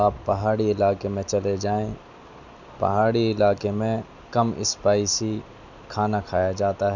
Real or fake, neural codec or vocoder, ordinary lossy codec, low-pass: real; none; none; 7.2 kHz